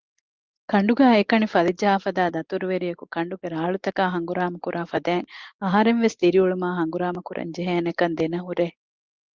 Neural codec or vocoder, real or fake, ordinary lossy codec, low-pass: none; real; Opus, 32 kbps; 7.2 kHz